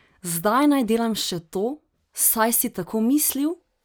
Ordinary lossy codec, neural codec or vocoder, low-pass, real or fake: none; none; none; real